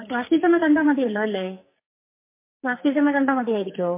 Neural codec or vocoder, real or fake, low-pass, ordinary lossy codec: codec, 44.1 kHz, 2.6 kbps, SNAC; fake; 3.6 kHz; MP3, 24 kbps